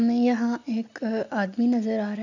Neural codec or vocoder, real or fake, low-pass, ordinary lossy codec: none; real; 7.2 kHz; none